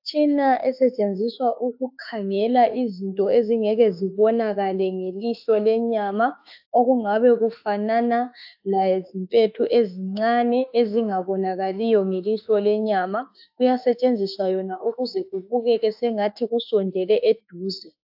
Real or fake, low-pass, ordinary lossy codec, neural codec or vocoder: fake; 5.4 kHz; AAC, 48 kbps; autoencoder, 48 kHz, 32 numbers a frame, DAC-VAE, trained on Japanese speech